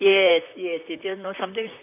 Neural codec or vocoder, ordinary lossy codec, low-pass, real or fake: vocoder, 44.1 kHz, 128 mel bands, Pupu-Vocoder; none; 3.6 kHz; fake